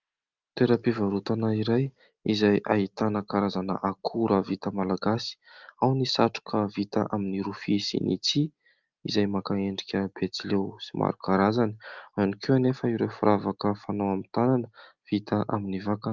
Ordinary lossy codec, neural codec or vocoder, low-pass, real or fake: Opus, 32 kbps; none; 7.2 kHz; real